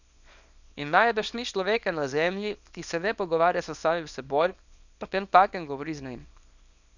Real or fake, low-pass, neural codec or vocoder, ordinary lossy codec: fake; 7.2 kHz; codec, 24 kHz, 0.9 kbps, WavTokenizer, small release; none